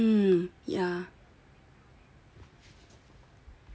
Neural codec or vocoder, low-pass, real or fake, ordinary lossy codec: none; none; real; none